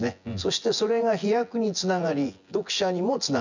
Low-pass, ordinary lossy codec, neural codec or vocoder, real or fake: 7.2 kHz; none; vocoder, 24 kHz, 100 mel bands, Vocos; fake